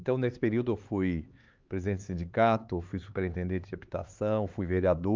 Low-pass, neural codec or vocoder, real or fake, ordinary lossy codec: 7.2 kHz; codec, 16 kHz, 4 kbps, X-Codec, HuBERT features, trained on LibriSpeech; fake; Opus, 24 kbps